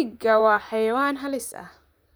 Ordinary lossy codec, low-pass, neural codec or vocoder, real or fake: none; none; vocoder, 44.1 kHz, 128 mel bands, Pupu-Vocoder; fake